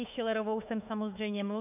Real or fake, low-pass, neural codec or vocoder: fake; 3.6 kHz; autoencoder, 48 kHz, 32 numbers a frame, DAC-VAE, trained on Japanese speech